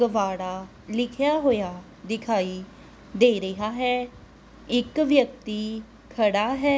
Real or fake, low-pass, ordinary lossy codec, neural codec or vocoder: real; none; none; none